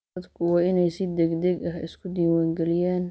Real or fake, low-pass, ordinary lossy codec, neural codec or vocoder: real; none; none; none